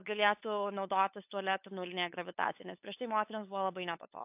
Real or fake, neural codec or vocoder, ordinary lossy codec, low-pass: fake; codec, 16 kHz, 8 kbps, FunCodec, trained on LibriTTS, 25 frames a second; AAC, 32 kbps; 3.6 kHz